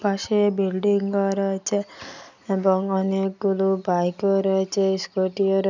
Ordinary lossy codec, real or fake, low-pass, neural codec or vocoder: none; real; 7.2 kHz; none